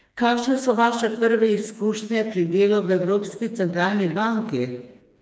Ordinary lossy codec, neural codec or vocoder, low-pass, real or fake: none; codec, 16 kHz, 2 kbps, FreqCodec, smaller model; none; fake